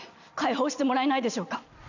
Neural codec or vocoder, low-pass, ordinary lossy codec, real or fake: none; 7.2 kHz; none; real